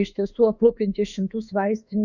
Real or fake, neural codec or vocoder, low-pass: fake; autoencoder, 48 kHz, 32 numbers a frame, DAC-VAE, trained on Japanese speech; 7.2 kHz